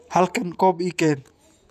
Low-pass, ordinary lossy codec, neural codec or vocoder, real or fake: 14.4 kHz; none; vocoder, 48 kHz, 128 mel bands, Vocos; fake